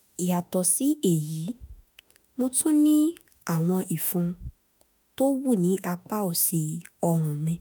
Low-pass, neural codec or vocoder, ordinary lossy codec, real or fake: none; autoencoder, 48 kHz, 32 numbers a frame, DAC-VAE, trained on Japanese speech; none; fake